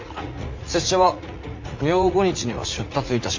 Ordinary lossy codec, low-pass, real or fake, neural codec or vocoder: MP3, 64 kbps; 7.2 kHz; fake; vocoder, 44.1 kHz, 80 mel bands, Vocos